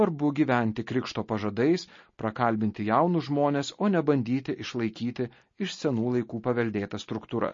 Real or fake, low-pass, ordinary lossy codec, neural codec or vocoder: real; 7.2 kHz; MP3, 32 kbps; none